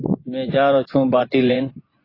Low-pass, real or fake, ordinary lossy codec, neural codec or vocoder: 5.4 kHz; fake; AAC, 24 kbps; vocoder, 24 kHz, 100 mel bands, Vocos